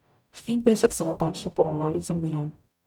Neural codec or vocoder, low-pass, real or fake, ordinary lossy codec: codec, 44.1 kHz, 0.9 kbps, DAC; 19.8 kHz; fake; none